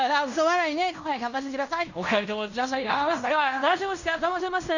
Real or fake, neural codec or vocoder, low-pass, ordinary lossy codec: fake; codec, 16 kHz in and 24 kHz out, 0.9 kbps, LongCat-Audio-Codec, fine tuned four codebook decoder; 7.2 kHz; none